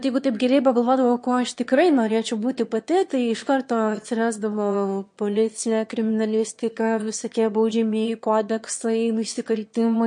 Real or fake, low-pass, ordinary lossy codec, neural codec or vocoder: fake; 9.9 kHz; MP3, 48 kbps; autoencoder, 22.05 kHz, a latent of 192 numbers a frame, VITS, trained on one speaker